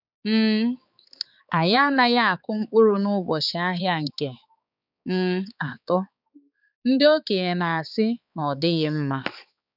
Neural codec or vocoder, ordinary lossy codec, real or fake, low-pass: codec, 16 kHz, 4 kbps, X-Codec, HuBERT features, trained on balanced general audio; none; fake; 5.4 kHz